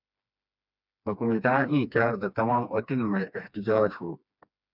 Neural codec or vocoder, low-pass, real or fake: codec, 16 kHz, 2 kbps, FreqCodec, smaller model; 5.4 kHz; fake